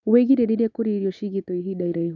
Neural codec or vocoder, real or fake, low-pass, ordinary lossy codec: none; real; 7.2 kHz; none